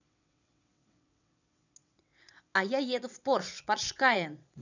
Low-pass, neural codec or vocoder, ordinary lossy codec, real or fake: 7.2 kHz; vocoder, 22.05 kHz, 80 mel bands, WaveNeXt; none; fake